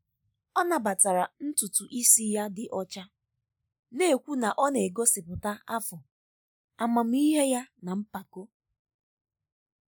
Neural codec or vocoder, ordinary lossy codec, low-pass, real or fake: none; none; none; real